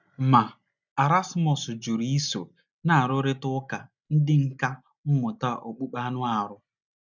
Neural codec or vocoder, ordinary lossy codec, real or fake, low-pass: none; none; real; 7.2 kHz